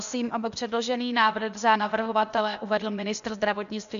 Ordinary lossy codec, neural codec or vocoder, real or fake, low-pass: AAC, 96 kbps; codec, 16 kHz, 0.8 kbps, ZipCodec; fake; 7.2 kHz